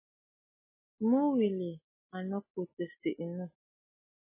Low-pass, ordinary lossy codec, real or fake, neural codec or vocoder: 3.6 kHz; MP3, 16 kbps; real; none